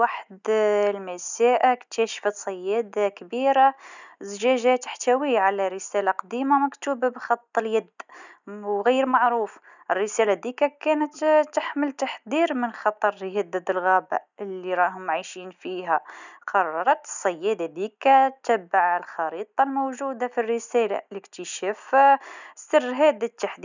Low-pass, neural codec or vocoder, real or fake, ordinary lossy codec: 7.2 kHz; none; real; none